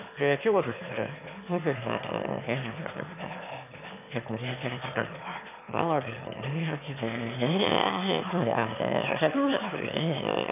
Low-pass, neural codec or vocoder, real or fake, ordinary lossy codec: 3.6 kHz; autoencoder, 22.05 kHz, a latent of 192 numbers a frame, VITS, trained on one speaker; fake; none